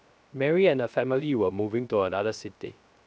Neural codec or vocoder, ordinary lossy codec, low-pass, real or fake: codec, 16 kHz, 0.3 kbps, FocalCodec; none; none; fake